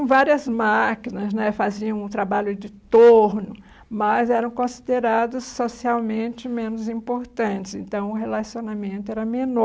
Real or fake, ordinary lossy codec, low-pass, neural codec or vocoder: real; none; none; none